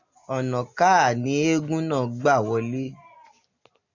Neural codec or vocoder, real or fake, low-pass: none; real; 7.2 kHz